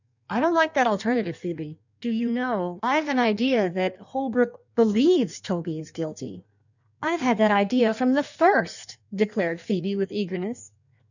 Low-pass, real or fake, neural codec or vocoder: 7.2 kHz; fake; codec, 16 kHz in and 24 kHz out, 1.1 kbps, FireRedTTS-2 codec